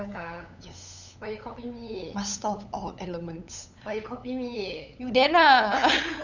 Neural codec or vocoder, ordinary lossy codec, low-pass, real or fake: codec, 16 kHz, 8 kbps, FunCodec, trained on LibriTTS, 25 frames a second; none; 7.2 kHz; fake